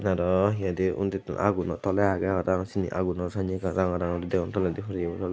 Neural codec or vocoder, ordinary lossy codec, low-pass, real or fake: none; none; none; real